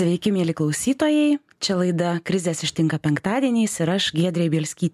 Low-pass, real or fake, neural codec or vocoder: 14.4 kHz; real; none